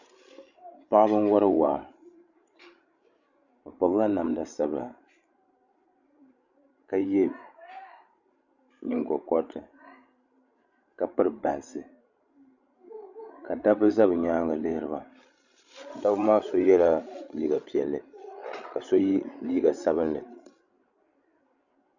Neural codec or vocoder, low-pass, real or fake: codec, 16 kHz, 16 kbps, FreqCodec, larger model; 7.2 kHz; fake